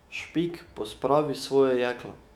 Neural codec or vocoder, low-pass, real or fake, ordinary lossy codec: autoencoder, 48 kHz, 128 numbers a frame, DAC-VAE, trained on Japanese speech; 19.8 kHz; fake; none